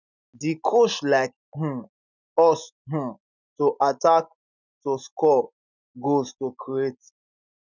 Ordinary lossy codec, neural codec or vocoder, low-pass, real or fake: none; none; 7.2 kHz; real